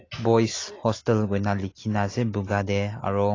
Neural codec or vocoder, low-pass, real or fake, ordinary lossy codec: none; 7.2 kHz; real; AAC, 32 kbps